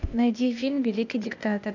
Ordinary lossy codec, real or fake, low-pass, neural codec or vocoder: none; fake; 7.2 kHz; codec, 16 kHz, 0.8 kbps, ZipCodec